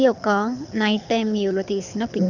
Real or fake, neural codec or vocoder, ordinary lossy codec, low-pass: fake; codec, 24 kHz, 6 kbps, HILCodec; none; 7.2 kHz